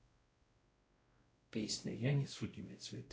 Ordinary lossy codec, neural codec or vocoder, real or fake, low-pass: none; codec, 16 kHz, 0.5 kbps, X-Codec, WavLM features, trained on Multilingual LibriSpeech; fake; none